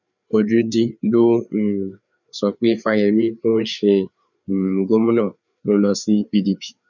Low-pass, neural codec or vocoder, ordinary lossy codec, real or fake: 7.2 kHz; codec, 16 kHz, 4 kbps, FreqCodec, larger model; none; fake